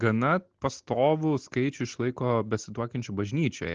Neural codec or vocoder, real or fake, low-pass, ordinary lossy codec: none; real; 7.2 kHz; Opus, 16 kbps